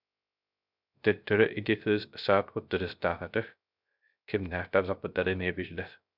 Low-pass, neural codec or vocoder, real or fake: 5.4 kHz; codec, 16 kHz, 0.3 kbps, FocalCodec; fake